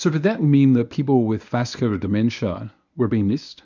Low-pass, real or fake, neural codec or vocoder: 7.2 kHz; fake; codec, 24 kHz, 0.9 kbps, WavTokenizer, medium speech release version 1